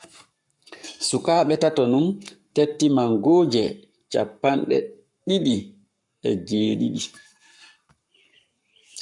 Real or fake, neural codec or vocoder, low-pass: fake; codec, 44.1 kHz, 7.8 kbps, Pupu-Codec; 10.8 kHz